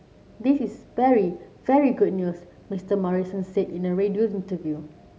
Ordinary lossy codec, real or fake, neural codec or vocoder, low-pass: none; real; none; none